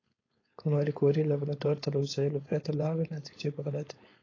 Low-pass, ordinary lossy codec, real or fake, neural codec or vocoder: 7.2 kHz; AAC, 32 kbps; fake; codec, 16 kHz, 4.8 kbps, FACodec